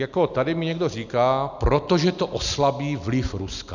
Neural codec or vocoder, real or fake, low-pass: none; real; 7.2 kHz